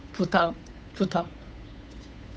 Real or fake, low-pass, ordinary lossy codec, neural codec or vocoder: fake; none; none; codec, 16 kHz, 8 kbps, FunCodec, trained on Chinese and English, 25 frames a second